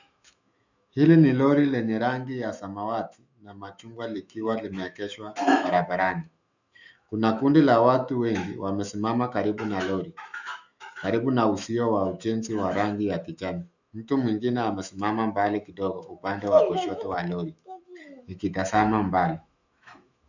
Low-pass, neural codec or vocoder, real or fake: 7.2 kHz; autoencoder, 48 kHz, 128 numbers a frame, DAC-VAE, trained on Japanese speech; fake